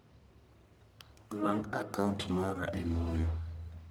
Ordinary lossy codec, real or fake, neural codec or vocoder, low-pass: none; fake; codec, 44.1 kHz, 1.7 kbps, Pupu-Codec; none